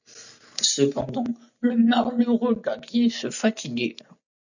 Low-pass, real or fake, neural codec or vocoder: 7.2 kHz; real; none